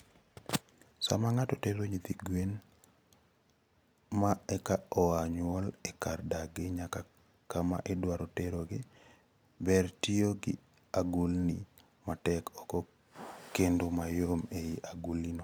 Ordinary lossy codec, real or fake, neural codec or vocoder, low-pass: none; real; none; none